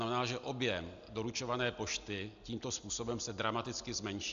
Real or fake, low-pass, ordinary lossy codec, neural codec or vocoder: real; 7.2 kHz; AAC, 96 kbps; none